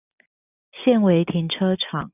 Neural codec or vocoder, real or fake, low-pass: none; real; 3.6 kHz